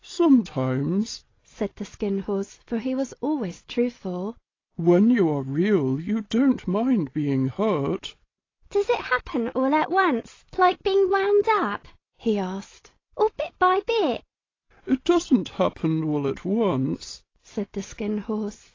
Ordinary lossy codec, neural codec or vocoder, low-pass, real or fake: AAC, 32 kbps; none; 7.2 kHz; real